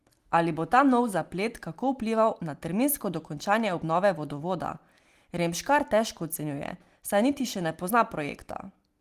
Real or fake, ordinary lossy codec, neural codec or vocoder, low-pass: real; Opus, 24 kbps; none; 14.4 kHz